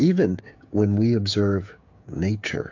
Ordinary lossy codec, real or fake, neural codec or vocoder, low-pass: AAC, 48 kbps; fake; codec, 16 kHz, 8 kbps, FreqCodec, smaller model; 7.2 kHz